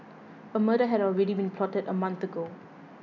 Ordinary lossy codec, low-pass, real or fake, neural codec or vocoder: none; 7.2 kHz; real; none